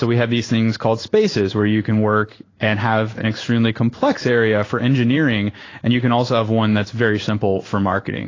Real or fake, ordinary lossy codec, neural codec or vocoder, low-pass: fake; AAC, 32 kbps; codec, 16 kHz in and 24 kHz out, 1 kbps, XY-Tokenizer; 7.2 kHz